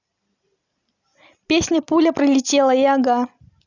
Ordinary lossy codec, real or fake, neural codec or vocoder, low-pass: none; real; none; 7.2 kHz